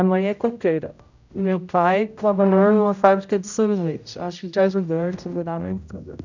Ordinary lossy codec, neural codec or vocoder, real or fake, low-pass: none; codec, 16 kHz, 0.5 kbps, X-Codec, HuBERT features, trained on general audio; fake; 7.2 kHz